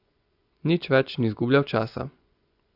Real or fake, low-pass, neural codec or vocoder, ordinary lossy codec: fake; 5.4 kHz; vocoder, 24 kHz, 100 mel bands, Vocos; Opus, 64 kbps